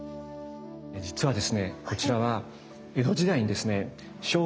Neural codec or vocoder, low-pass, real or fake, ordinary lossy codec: none; none; real; none